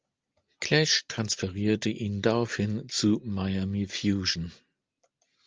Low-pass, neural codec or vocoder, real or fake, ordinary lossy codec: 7.2 kHz; none; real; Opus, 24 kbps